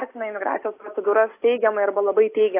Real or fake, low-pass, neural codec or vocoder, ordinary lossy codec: real; 3.6 kHz; none; AAC, 24 kbps